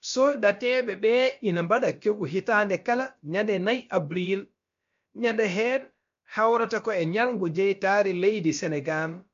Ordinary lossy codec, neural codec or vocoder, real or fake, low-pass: MP3, 48 kbps; codec, 16 kHz, about 1 kbps, DyCAST, with the encoder's durations; fake; 7.2 kHz